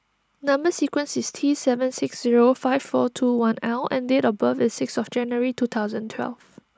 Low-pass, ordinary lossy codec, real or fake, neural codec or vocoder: none; none; real; none